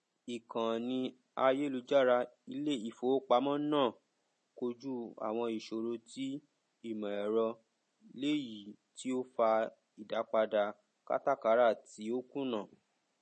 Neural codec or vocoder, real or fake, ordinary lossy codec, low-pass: none; real; MP3, 32 kbps; 10.8 kHz